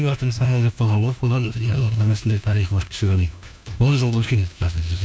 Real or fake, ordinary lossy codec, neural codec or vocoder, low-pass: fake; none; codec, 16 kHz, 1 kbps, FunCodec, trained on LibriTTS, 50 frames a second; none